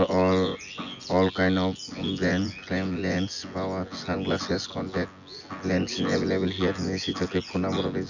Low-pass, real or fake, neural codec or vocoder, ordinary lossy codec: 7.2 kHz; fake; vocoder, 24 kHz, 100 mel bands, Vocos; none